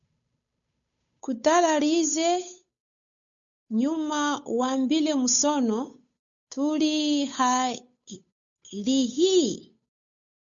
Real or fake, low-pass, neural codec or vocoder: fake; 7.2 kHz; codec, 16 kHz, 8 kbps, FunCodec, trained on Chinese and English, 25 frames a second